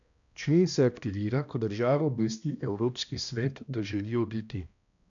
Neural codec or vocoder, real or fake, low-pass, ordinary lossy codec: codec, 16 kHz, 1 kbps, X-Codec, HuBERT features, trained on balanced general audio; fake; 7.2 kHz; none